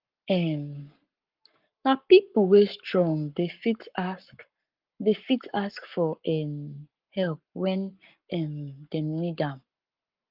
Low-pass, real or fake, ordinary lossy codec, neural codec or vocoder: 5.4 kHz; fake; Opus, 32 kbps; codec, 44.1 kHz, 7.8 kbps, Pupu-Codec